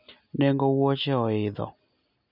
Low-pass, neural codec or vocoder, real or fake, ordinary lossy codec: 5.4 kHz; none; real; none